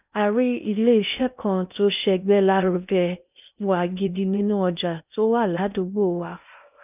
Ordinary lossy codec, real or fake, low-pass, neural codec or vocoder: none; fake; 3.6 kHz; codec, 16 kHz in and 24 kHz out, 0.6 kbps, FocalCodec, streaming, 2048 codes